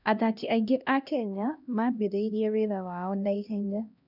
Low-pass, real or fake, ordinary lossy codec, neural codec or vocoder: 5.4 kHz; fake; none; codec, 16 kHz, 1 kbps, X-Codec, HuBERT features, trained on LibriSpeech